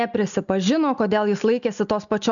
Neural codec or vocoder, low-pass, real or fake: none; 7.2 kHz; real